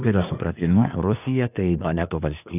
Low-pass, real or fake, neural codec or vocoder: 3.6 kHz; fake; codec, 24 kHz, 1 kbps, SNAC